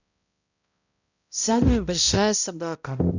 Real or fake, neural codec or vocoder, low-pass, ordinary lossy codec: fake; codec, 16 kHz, 0.5 kbps, X-Codec, HuBERT features, trained on balanced general audio; 7.2 kHz; none